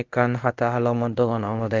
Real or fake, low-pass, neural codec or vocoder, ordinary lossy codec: fake; 7.2 kHz; codec, 24 kHz, 0.5 kbps, DualCodec; Opus, 32 kbps